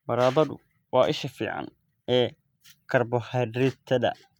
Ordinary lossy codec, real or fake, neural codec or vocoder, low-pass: none; real; none; 19.8 kHz